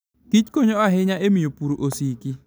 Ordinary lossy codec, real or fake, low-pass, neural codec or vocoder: none; real; none; none